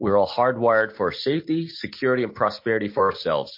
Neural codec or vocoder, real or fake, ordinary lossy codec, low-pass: none; real; MP3, 32 kbps; 5.4 kHz